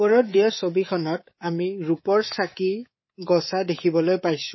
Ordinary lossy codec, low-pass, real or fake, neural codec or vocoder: MP3, 24 kbps; 7.2 kHz; fake; codec, 24 kHz, 3.1 kbps, DualCodec